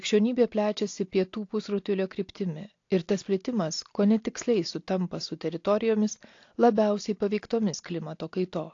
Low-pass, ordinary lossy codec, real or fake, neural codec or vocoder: 7.2 kHz; AAC, 48 kbps; real; none